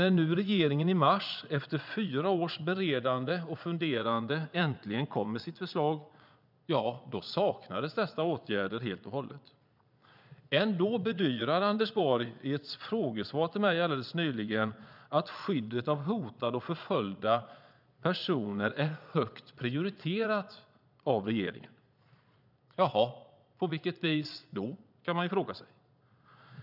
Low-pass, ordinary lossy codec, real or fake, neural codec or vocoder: 5.4 kHz; none; fake; vocoder, 22.05 kHz, 80 mel bands, Vocos